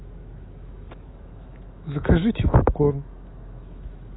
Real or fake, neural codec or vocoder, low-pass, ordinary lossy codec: fake; autoencoder, 48 kHz, 128 numbers a frame, DAC-VAE, trained on Japanese speech; 7.2 kHz; AAC, 16 kbps